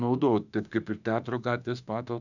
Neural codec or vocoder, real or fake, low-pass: autoencoder, 48 kHz, 32 numbers a frame, DAC-VAE, trained on Japanese speech; fake; 7.2 kHz